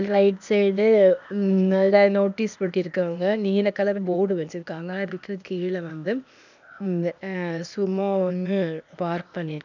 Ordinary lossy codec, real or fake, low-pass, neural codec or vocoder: none; fake; 7.2 kHz; codec, 16 kHz, 0.8 kbps, ZipCodec